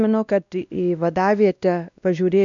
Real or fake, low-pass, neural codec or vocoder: fake; 7.2 kHz; codec, 16 kHz, 1 kbps, X-Codec, WavLM features, trained on Multilingual LibriSpeech